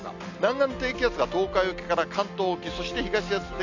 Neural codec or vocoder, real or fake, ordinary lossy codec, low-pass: none; real; none; 7.2 kHz